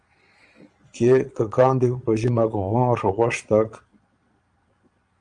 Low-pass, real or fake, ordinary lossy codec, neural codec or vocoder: 9.9 kHz; fake; Opus, 32 kbps; vocoder, 22.05 kHz, 80 mel bands, Vocos